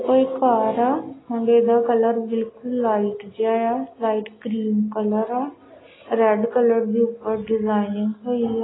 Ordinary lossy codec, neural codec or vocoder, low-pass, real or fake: AAC, 16 kbps; none; 7.2 kHz; real